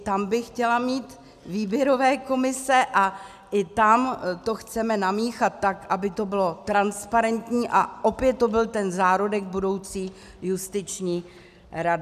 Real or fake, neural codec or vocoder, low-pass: real; none; 14.4 kHz